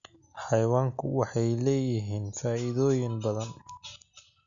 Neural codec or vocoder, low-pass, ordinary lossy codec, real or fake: none; 7.2 kHz; none; real